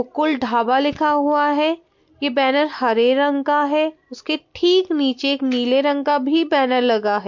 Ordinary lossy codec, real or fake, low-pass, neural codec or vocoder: MP3, 48 kbps; real; 7.2 kHz; none